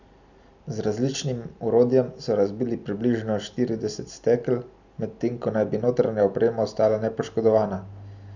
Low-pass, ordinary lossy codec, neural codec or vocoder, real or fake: 7.2 kHz; none; none; real